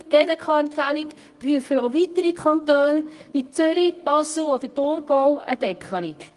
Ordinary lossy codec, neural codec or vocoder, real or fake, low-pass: Opus, 24 kbps; codec, 24 kHz, 0.9 kbps, WavTokenizer, medium music audio release; fake; 10.8 kHz